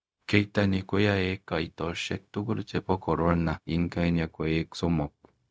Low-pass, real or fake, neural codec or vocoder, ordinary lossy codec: none; fake; codec, 16 kHz, 0.4 kbps, LongCat-Audio-Codec; none